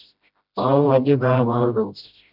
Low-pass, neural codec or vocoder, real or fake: 5.4 kHz; codec, 16 kHz, 0.5 kbps, FreqCodec, smaller model; fake